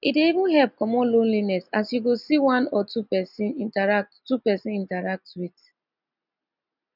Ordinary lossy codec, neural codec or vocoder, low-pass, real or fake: none; none; 5.4 kHz; real